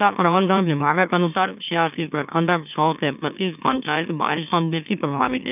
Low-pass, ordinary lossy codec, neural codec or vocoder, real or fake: 3.6 kHz; none; autoencoder, 44.1 kHz, a latent of 192 numbers a frame, MeloTTS; fake